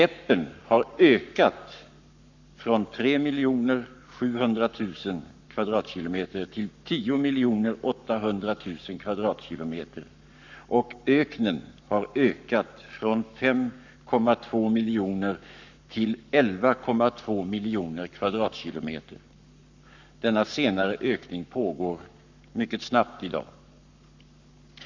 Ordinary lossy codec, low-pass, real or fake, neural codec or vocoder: none; 7.2 kHz; fake; codec, 44.1 kHz, 7.8 kbps, Pupu-Codec